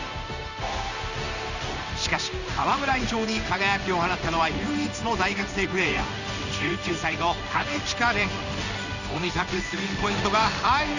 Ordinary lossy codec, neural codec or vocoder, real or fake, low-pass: none; codec, 16 kHz in and 24 kHz out, 1 kbps, XY-Tokenizer; fake; 7.2 kHz